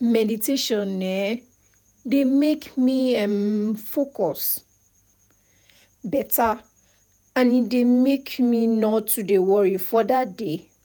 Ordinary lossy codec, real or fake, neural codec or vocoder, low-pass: none; fake; vocoder, 48 kHz, 128 mel bands, Vocos; none